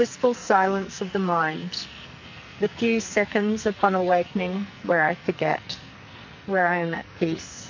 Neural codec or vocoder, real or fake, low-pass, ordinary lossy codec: codec, 44.1 kHz, 2.6 kbps, SNAC; fake; 7.2 kHz; MP3, 48 kbps